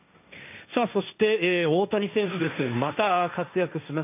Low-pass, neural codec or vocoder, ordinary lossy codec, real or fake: 3.6 kHz; codec, 16 kHz, 1.1 kbps, Voila-Tokenizer; none; fake